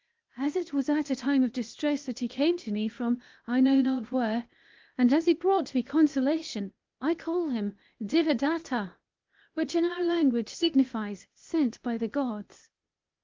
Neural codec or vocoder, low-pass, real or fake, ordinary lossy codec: codec, 16 kHz, 0.8 kbps, ZipCodec; 7.2 kHz; fake; Opus, 32 kbps